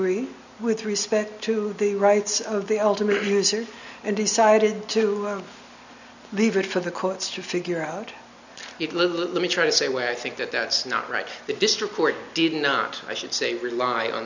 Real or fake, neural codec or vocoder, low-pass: real; none; 7.2 kHz